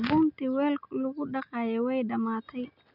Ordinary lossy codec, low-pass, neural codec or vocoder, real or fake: none; 5.4 kHz; none; real